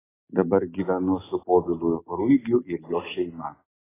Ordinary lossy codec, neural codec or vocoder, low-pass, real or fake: AAC, 16 kbps; none; 3.6 kHz; real